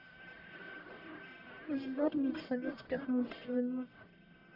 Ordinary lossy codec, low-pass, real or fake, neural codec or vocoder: none; 5.4 kHz; fake; codec, 44.1 kHz, 1.7 kbps, Pupu-Codec